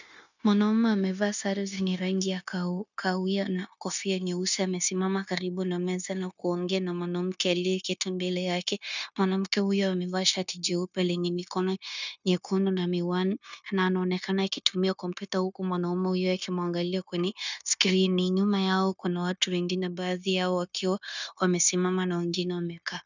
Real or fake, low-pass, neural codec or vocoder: fake; 7.2 kHz; codec, 16 kHz, 0.9 kbps, LongCat-Audio-Codec